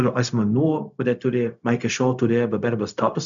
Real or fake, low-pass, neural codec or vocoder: fake; 7.2 kHz; codec, 16 kHz, 0.4 kbps, LongCat-Audio-Codec